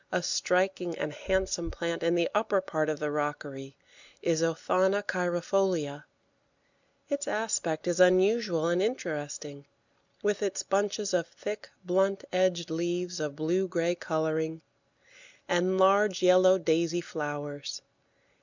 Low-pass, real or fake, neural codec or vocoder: 7.2 kHz; real; none